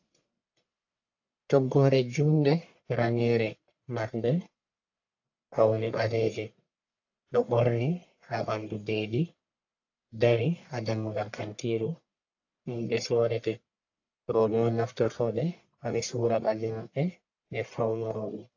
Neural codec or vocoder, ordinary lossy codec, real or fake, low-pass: codec, 44.1 kHz, 1.7 kbps, Pupu-Codec; AAC, 48 kbps; fake; 7.2 kHz